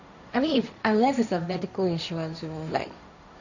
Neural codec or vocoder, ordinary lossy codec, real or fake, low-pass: codec, 16 kHz, 1.1 kbps, Voila-Tokenizer; none; fake; 7.2 kHz